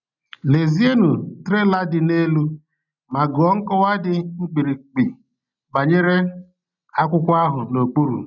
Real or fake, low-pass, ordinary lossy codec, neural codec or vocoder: real; 7.2 kHz; none; none